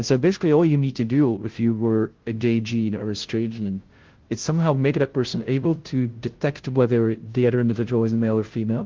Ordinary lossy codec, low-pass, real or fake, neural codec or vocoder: Opus, 16 kbps; 7.2 kHz; fake; codec, 16 kHz, 0.5 kbps, FunCodec, trained on Chinese and English, 25 frames a second